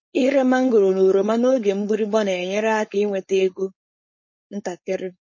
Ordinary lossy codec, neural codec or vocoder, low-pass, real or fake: MP3, 32 kbps; codec, 16 kHz, 4.8 kbps, FACodec; 7.2 kHz; fake